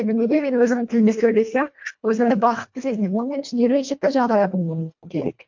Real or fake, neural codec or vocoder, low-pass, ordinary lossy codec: fake; codec, 24 kHz, 1.5 kbps, HILCodec; 7.2 kHz; MP3, 48 kbps